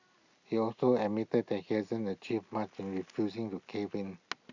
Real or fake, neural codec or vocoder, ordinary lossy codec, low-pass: real; none; Opus, 64 kbps; 7.2 kHz